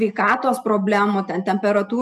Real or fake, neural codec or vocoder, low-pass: real; none; 14.4 kHz